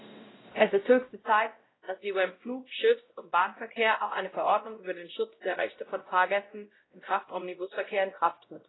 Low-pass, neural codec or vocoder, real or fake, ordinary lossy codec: 7.2 kHz; codec, 16 kHz, 0.5 kbps, X-Codec, WavLM features, trained on Multilingual LibriSpeech; fake; AAC, 16 kbps